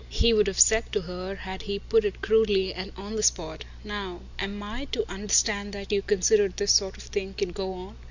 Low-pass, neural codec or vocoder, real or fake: 7.2 kHz; none; real